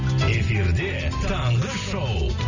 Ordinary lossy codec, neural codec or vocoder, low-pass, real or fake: none; none; 7.2 kHz; real